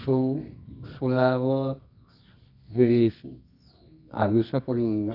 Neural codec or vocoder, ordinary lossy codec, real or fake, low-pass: codec, 24 kHz, 0.9 kbps, WavTokenizer, medium music audio release; none; fake; 5.4 kHz